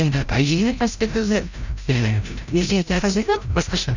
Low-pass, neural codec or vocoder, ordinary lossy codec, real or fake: 7.2 kHz; codec, 16 kHz, 0.5 kbps, FreqCodec, larger model; none; fake